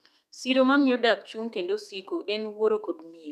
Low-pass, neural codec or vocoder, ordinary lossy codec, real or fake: 14.4 kHz; codec, 32 kHz, 1.9 kbps, SNAC; none; fake